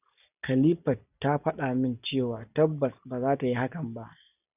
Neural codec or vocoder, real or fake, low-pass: none; real; 3.6 kHz